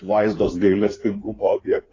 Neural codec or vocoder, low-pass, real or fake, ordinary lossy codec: codec, 16 kHz in and 24 kHz out, 2.2 kbps, FireRedTTS-2 codec; 7.2 kHz; fake; AAC, 32 kbps